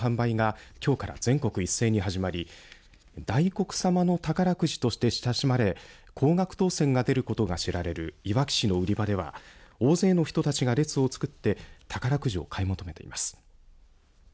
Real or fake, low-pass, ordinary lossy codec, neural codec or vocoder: real; none; none; none